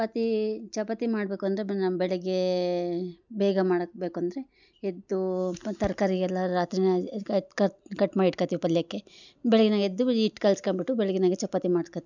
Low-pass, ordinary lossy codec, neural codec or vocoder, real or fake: 7.2 kHz; none; none; real